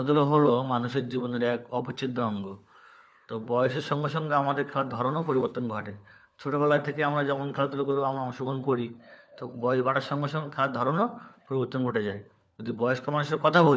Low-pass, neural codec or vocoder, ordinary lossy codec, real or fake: none; codec, 16 kHz, 4 kbps, FunCodec, trained on LibriTTS, 50 frames a second; none; fake